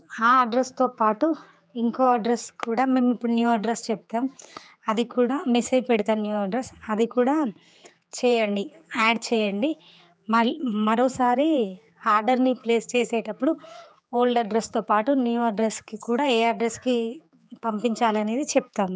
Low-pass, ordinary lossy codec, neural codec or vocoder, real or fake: none; none; codec, 16 kHz, 4 kbps, X-Codec, HuBERT features, trained on general audio; fake